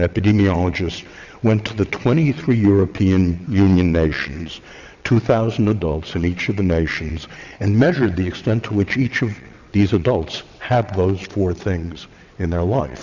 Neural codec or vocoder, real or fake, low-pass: vocoder, 22.05 kHz, 80 mel bands, WaveNeXt; fake; 7.2 kHz